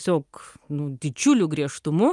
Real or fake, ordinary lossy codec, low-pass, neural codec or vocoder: real; Opus, 32 kbps; 10.8 kHz; none